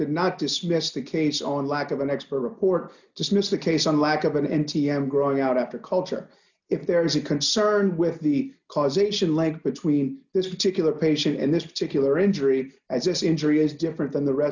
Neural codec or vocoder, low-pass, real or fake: none; 7.2 kHz; real